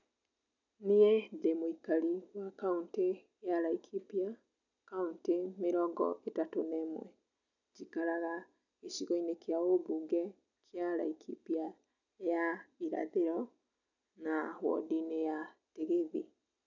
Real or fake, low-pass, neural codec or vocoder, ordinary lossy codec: real; 7.2 kHz; none; none